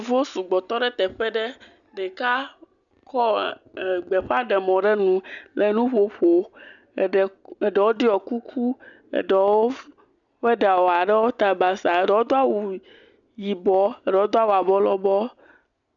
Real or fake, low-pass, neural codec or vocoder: real; 7.2 kHz; none